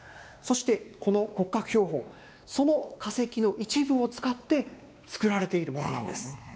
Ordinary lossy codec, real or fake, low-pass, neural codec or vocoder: none; fake; none; codec, 16 kHz, 2 kbps, X-Codec, WavLM features, trained on Multilingual LibriSpeech